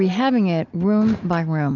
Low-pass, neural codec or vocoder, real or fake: 7.2 kHz; none; real